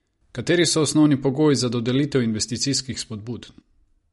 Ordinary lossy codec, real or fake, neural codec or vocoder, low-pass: MP3, 48 kbps; real; none; 19.8 kHz